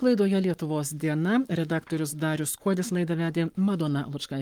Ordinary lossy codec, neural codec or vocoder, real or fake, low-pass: Opus, 32 kbps; codec, 44.1 kHz, 7.8 kbps, Pupu-Codec; fake; 19.8 kHz